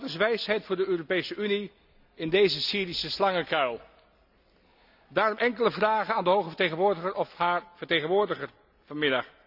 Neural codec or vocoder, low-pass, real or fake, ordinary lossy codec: none; 5.4 kHz; real; none